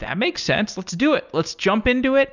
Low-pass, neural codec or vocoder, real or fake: 7.2 kHz; none; real